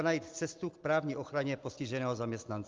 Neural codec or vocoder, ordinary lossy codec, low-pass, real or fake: none; Opus, 32 kbps; 7.2 kHz; real